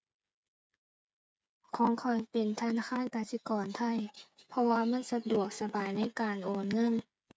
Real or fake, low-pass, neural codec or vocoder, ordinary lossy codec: fake; none; codec, 16 kHz, 8 kbps, FreqCodec, smaller model; none